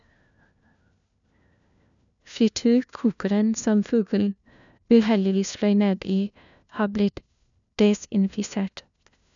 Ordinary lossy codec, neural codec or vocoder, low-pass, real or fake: none; codec, 16 kHz, 1 kbps, FunCodec, trained on LibriTTS, 50 frames a second; 7.2 kHz; fake